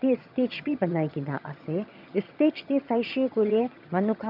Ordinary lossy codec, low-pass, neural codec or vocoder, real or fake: none; 5.4 kHz; vocoder, 22.05 kHz, 80 mel bands, HiFi-GAN; fake